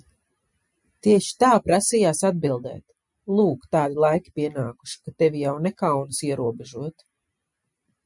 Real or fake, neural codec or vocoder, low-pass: real; none; 10.8 kHz